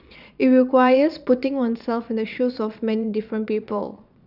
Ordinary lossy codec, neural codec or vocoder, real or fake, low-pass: none; vocoder, 22.05 kHz, 80 mel bands, Vocos; fake; 5.4 kHz